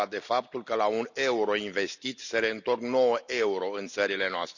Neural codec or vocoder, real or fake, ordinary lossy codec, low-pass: none; real; none; 7.2 kHz